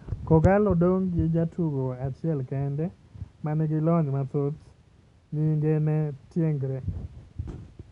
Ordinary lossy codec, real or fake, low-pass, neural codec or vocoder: none; real; 10.8 kHz; none